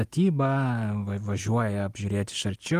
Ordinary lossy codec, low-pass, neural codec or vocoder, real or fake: Opus, 24 kbps; 14.4 kHz; none; real